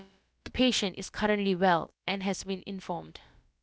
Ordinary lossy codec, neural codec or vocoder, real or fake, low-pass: none; codec, 16 kHz, about 1 kbps, DyCAST, with the encoder's durations; fake; none